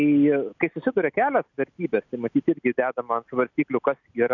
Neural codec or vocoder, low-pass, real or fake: none; 7.2 kHz; real